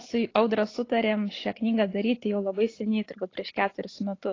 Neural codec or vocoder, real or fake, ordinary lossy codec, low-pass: none; real; AAC, 32 kbps; 7.2 kHz